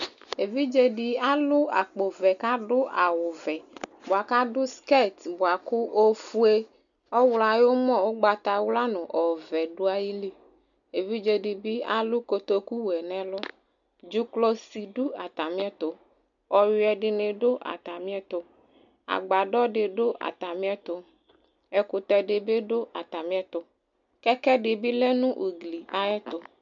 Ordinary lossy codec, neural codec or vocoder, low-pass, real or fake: MP3, 96 kbps; none; 7.2 kHz; real